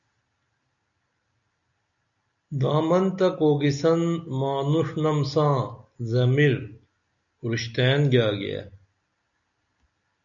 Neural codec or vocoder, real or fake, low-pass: none; real; 7.2 kHz